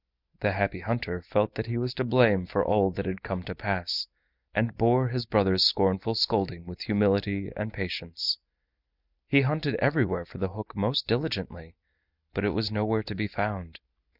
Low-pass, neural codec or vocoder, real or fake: 5.4 kHz; none; real